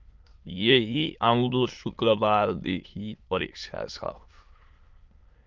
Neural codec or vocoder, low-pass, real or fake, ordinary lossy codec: autoencoder, 22.05 kHz, a latent of 192 numbers a frame, VITS, trained on many speakers; 7.2 kHz; fake; Opus, 24 kbps